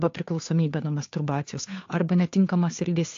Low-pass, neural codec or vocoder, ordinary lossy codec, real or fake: 7.2 kHz; codec, 16 kHz, 2 kbps, FunCodec, trained on Chinese and English, 25 frames a second; AAC, 48 kbps; fake